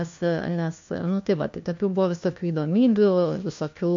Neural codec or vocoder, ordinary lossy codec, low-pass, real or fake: codec, 16 kHz, 1 kbps, FunCodec, trained on LibriTTS, 50 frames a second; MP3, 48 kbps; 7.2 kHz; fake